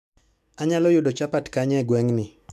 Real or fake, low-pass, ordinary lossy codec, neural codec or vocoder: fake; 14.4 kHz; MP3, 96 kbps; autoencoder, 48 kHz, 128 numbers a frame, DAC-VAE, trained on Japanese speech